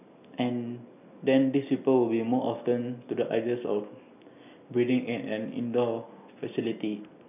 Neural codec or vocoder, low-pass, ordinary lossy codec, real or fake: none; 3.6 kHz; none; real